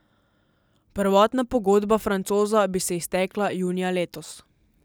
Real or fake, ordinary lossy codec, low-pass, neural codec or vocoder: real; none; none; none